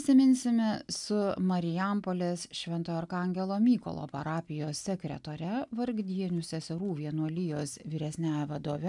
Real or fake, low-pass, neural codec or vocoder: real; 10.8 kHz; none